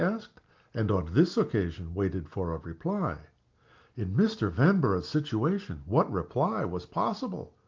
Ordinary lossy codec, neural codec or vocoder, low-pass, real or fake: Opus, 32 kbps; none; 7.2 kHz; real